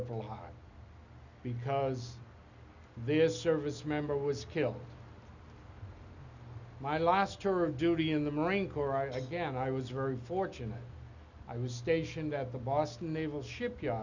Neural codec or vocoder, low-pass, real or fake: none; 7.2 kHz; real